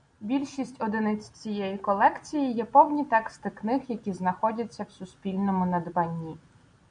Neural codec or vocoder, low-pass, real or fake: none; 9.9 kHz; real